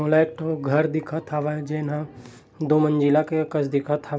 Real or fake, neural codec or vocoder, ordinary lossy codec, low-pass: real; none; none; none